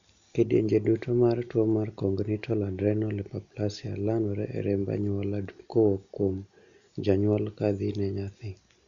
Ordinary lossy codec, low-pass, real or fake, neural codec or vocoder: none; 7.2 kHz; real; none